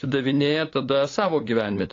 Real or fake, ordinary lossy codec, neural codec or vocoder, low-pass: fake; AAC, 32 kbps; codec, 16 kHz, 8 kbps, FunCodec, trained on LibriTTS, 25 frames a second; 7.2 kHz